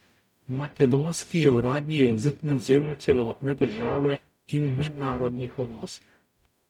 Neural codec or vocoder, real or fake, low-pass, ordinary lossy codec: codec, 44.1 kHz, 0.9 kbps, DAC; fake; 19.8 kHz; none